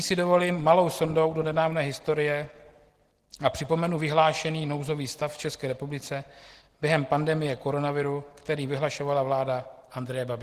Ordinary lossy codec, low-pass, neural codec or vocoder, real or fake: Opus, 16 kbps; 14.4 kHz; none; real